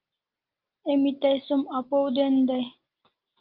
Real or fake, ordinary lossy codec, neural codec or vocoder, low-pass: real; Opus, 32 kbps; none; 5.4 kHz